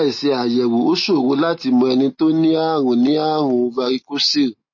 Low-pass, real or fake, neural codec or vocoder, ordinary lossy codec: 7.2 kHz; real; none; MP3, 32 kbps